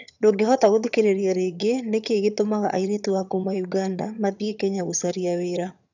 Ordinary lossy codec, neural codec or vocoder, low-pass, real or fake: none; vocoder, 22.05 kHz, 80 mel bands, HiFi-GAN; 7.2 kHz; fake